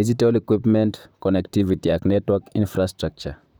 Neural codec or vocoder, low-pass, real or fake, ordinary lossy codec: vocoder, 44.1 kHz, 128 mel bands, Pupu-Vocoder; none; fake; none